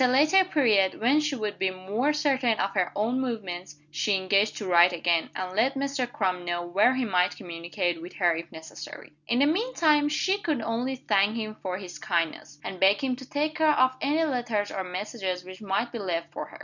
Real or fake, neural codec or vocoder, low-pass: real; none; 7.2 kHz